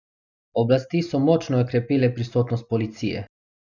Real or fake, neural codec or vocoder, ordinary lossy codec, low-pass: real; none; none; 7.2 kHz